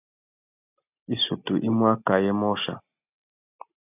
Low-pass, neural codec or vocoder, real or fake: 3.6 kHz; none; real